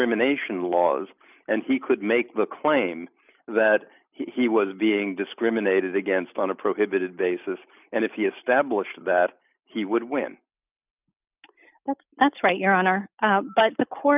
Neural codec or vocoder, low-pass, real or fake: none; 3.6 kHz; real